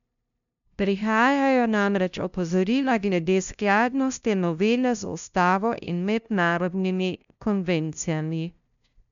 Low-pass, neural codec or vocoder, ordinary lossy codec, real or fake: 7.2 kHz; codec, 16 kHz, 0.5 kbps, FunCodec, trained on LibriTTS, 25 frames a second; none; fake